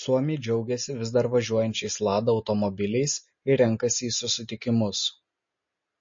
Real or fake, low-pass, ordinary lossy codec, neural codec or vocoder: real; 7.2 kHz; MP3, 32 kbps; none